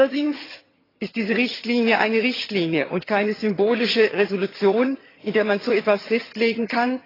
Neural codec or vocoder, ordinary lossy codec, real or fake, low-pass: vocoder, 22.05 kHz, 80 mel bands, HiFi-GAN; AAC, 24 kbps; fake; 5.4 kHz